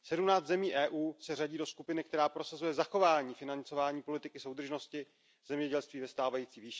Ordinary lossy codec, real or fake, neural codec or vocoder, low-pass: none; real; none; none